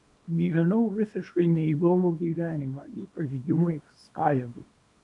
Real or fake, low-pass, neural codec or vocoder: fake; 10.8 kHz; codec, 24 kHz, 0.9 kbps, WavTokenizer, small release